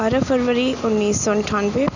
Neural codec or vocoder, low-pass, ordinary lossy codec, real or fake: none; 7.2 kHz; none; real